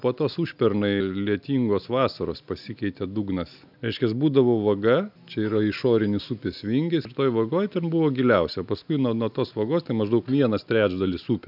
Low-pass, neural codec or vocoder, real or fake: 5.4 kHz; none; real